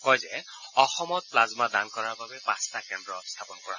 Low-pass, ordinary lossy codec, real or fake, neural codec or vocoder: 7.2 kHz; none; real; none